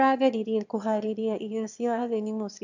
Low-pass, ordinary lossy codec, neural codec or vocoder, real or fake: 7.2 kHz; none; autoencoder, 22.05 kHz, a latent of 192 numbers a frame, VITS, trained on one speaker; fake